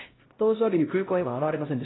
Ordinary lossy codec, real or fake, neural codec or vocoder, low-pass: AAC, 16 kbps; fake; codec, 16 kHz, 0.5 kbps, X-Codec, HuBERT features, trained on LibriSpeech; 7.2 kHz